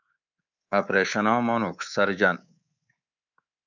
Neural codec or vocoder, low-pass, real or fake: codec, 24 kHz, 3.1 kbps, DualCodec; 7.2 kHz; fake